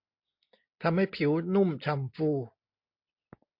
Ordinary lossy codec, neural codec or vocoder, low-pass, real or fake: MP3, 48 kbps; none; 5.4 kHz; real